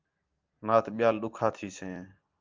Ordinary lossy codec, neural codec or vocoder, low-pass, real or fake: Opus, 32 kbps; none; 7.2 kHz; real